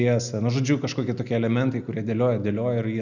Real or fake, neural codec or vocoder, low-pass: real; none; 7.2 kHz